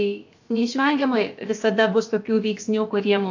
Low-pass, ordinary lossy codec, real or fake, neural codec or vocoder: 7.2 kHz; AAC, 48 kbps; fake; codec, 16 kHz, about 1 kbps, DyCAST, with the encoder's durations